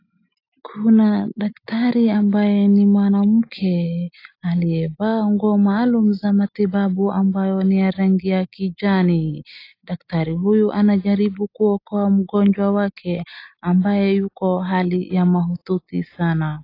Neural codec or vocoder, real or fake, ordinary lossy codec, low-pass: none; real; AAC, 32 kbps; 5.4 kHz